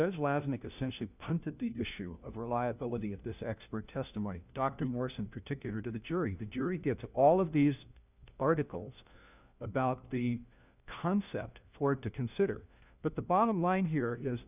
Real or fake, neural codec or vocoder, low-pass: fake; codec, 16 kHz, 1 kbps, FunCodec, trained on LibriTTS, 50 frames a second; 3.6 kHz